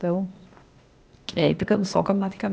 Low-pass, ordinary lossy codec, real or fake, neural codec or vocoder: none; none; fake; codec, 16 kHz, 0.8 kbps, ZipCodec